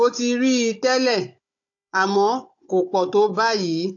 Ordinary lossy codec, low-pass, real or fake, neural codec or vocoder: AAC, 48 kbps; 7.2 kHz; fake; codec, 16 kHz, 16 kbps, FunCodec, trained on Chinese and English, 50 frames a second